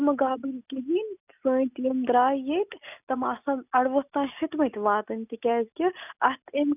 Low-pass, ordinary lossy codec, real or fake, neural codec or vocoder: 3.6 kHz; none; real; none